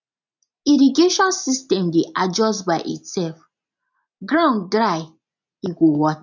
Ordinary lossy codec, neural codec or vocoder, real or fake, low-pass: none; none; real; 7.2 kHz